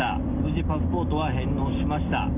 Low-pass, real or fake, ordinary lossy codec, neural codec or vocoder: 3.6 kHz; real; none; none